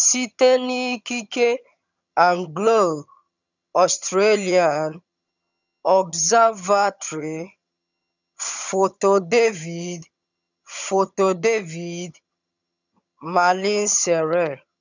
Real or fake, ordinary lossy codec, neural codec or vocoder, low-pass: fake; none; vocoder, 22.05 kHz, 80 mel bands, HiFi-GAN; 7.2 kHz